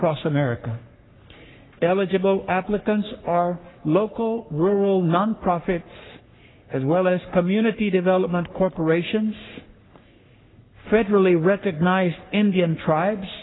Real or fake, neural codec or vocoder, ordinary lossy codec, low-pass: fake; codec, 44.1 kHz, 3.4 kbps, Pupu-Codec; AAC, 16 kbps; 7.2 kHz